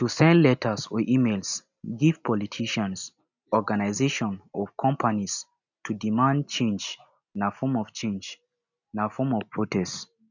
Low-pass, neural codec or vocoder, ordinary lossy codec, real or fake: 7.2 kHz; none; none; real